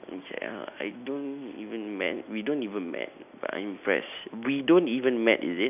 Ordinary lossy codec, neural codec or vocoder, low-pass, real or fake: none; none; 3.6 kHz; real